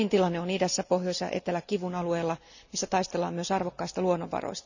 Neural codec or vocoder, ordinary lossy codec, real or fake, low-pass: none; none; real; 7.2 kHz